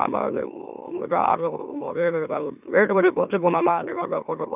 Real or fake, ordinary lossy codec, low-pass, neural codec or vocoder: fake; none; 3.6 kHz; autoencoder, 44.1 kHz, a latent of 192 numbers a frame, MeloTTS